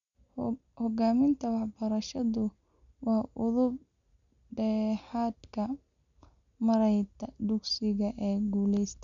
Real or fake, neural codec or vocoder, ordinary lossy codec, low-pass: real; none; none; 7.2 kHz